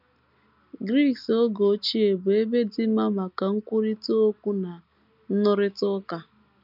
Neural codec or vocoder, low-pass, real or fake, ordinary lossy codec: none; 5.4 kHz; real; none